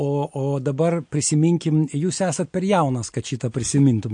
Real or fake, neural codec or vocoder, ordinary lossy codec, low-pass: real; none; MP3, 48 kbps; 9.9 kHz